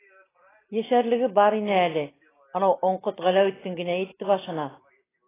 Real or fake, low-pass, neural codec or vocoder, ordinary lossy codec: real; 3.6 kHz; none; AAC, 16 kbps